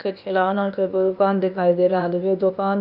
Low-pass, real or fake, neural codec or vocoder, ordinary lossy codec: 5.4 kHz; fake; codec, 16 kHz, 0.8 kbps, ZipCodec; none